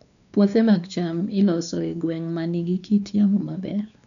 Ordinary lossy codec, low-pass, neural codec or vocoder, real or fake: none; 7.2 kHz; codec, 16 kHz, 2 kbps, X-Codec, WavLM features, trained on Multilingual LibriSpeech; fake